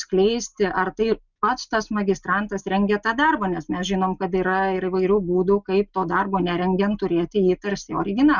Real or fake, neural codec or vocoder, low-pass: real; none; 7.2 kHz